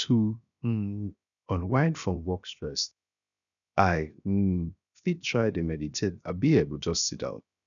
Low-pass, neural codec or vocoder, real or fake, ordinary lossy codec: 7.2 kHz; codec, 16 kHz, 0.7 kbps, FocalCodec; fake; none